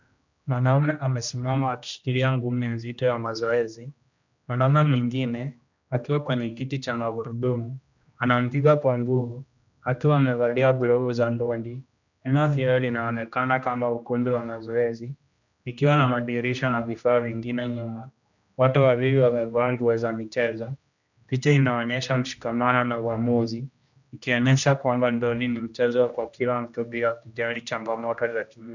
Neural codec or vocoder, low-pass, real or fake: codec, 16 kHz, 1 kbps, X-Codec, HuBERT features, trained on general audio; 7.2 kHz; fake